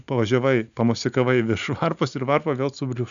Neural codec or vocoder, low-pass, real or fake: none; 7.2 kHz; real